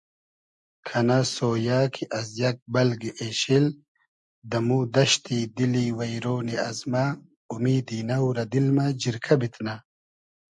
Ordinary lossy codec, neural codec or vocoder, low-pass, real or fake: MP3, 64 kbps; none; 10.8 kHz; real